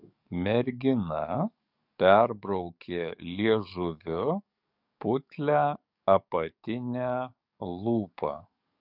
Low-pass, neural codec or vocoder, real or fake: 5.4 kHz; codec, 44.1 kHz, 7.8 kbps, DAC; fake